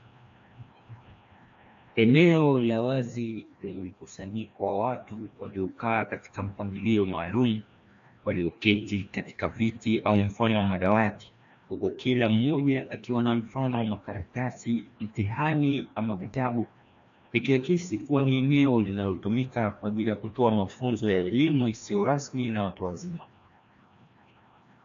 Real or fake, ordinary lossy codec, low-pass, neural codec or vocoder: fake; AAC, 64 kbps; 7.2 kHz; codec, 16 kHz, 1 kbps, FreqCodec, larger model